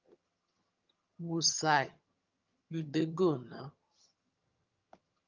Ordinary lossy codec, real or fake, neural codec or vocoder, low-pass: Opus, 24 kbps; fake; vocoder, 22.05 kHz, 80 mel bands, HiFi-GAN; 7.2 kHz